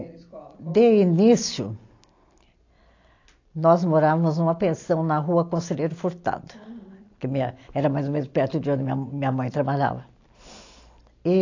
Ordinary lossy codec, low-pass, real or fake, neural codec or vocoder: AAC, 48 kbps; 7.2 kHz; real; none